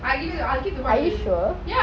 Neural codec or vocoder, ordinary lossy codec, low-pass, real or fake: none; none; none; real